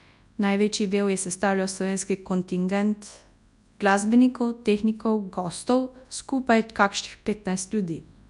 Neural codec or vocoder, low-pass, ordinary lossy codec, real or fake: codec, 24 kHz, 0.9 kbps, WavTokenizer, large speech release; 10.8 kHz; none; fake